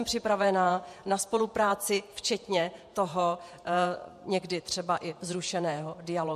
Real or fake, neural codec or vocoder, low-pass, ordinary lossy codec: fake; vocoder, 48 kHz, 128 mel bands, Vocos; 14.4 kHz; MP3, 64 kbps